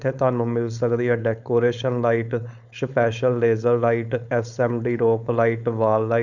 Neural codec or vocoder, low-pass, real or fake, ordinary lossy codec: codec, 16 kHz, 4.8 kbps, FACodec; 7.2 kHz; fake; none